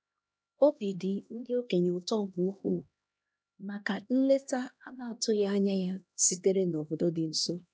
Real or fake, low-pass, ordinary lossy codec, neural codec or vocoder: fake; none; none; codec, 16 kHz, 1 kbps, X-Codec, HuBERT features, trained on LibriSpeech